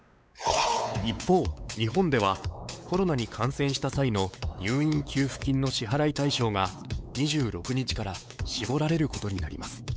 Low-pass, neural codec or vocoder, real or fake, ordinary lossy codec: none; codec, 16 kHz, 4 kbps, X-Codec, WavLM features, trained on Multilingual LibriSpeech; fake; none